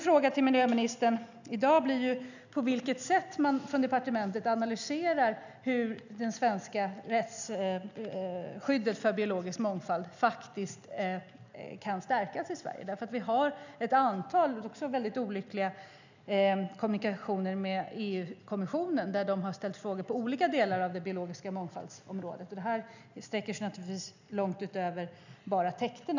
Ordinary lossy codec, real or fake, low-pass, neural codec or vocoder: none; real; 7.2 kHz; none